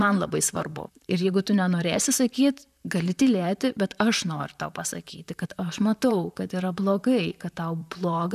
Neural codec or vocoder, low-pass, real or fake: vocoder, 44.1 kHz, 128 mel bands, Pupu-Vocoder; 14.4 kHz; fake